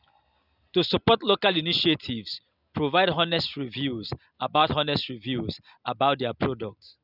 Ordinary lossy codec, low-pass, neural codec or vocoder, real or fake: none; 5.4 kHz; none; real